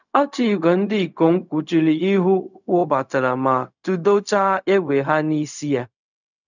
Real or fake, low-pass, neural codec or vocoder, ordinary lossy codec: fake; 7.2 kHz; codec, 16 kHz, 0.4 kbps, LongCat-Audio-Codec; none